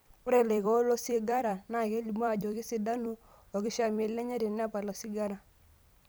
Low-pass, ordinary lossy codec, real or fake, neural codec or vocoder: none; none; fake; vocoder, 44.1 kHz, 128 mel bands every 256 samples, BigVGAN v2